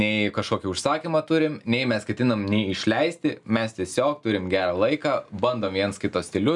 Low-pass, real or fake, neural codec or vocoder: 10.8 kHz; real; none